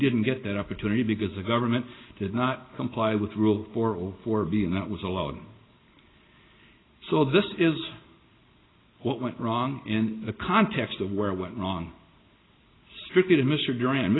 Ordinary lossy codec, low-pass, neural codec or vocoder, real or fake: AAC, 16 kbps; 7.2 kHz; none; real